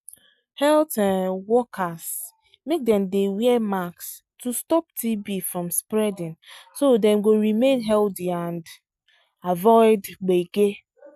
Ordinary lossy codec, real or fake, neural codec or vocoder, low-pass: none; real; none; 14.4 kHz